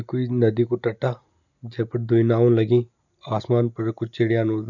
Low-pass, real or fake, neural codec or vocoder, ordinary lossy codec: 7.2 kHz; real; none; none